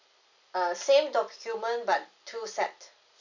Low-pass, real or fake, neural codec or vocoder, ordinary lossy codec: 7.2 kHz; real; none; none